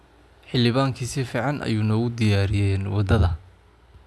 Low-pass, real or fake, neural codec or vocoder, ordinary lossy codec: none; real; none; none